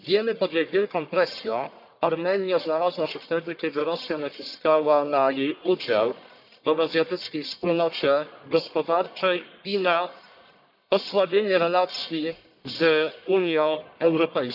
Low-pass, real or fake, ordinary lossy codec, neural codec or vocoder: 5.4 kHz; fake; none; codec, 44.1 kHz, 1.7 kbps, Pupu-Codec